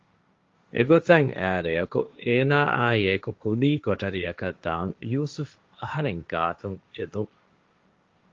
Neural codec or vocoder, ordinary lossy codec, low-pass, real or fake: codec, 16 kHz, 1.1 kbps, Voila-Tokenizer; Opus, 32 kbps; 7.2 kHz; fake